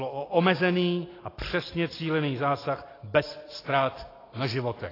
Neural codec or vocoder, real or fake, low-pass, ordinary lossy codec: none; real; 5.4 kHz; AAC, 24 kbps